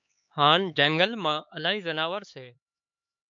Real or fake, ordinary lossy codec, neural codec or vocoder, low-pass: fake; AAC, 64 kbps; codec, 16 kHz, 4 kbps, X-Codec, HuBERT features, trained on LibriSpeech; 7.2 kHz